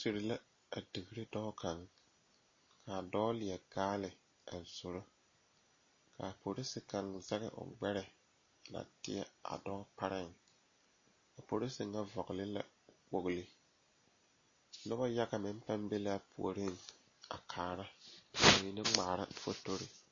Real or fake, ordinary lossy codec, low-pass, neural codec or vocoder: real; MP3, 32 kbps; 7.2 kHz; none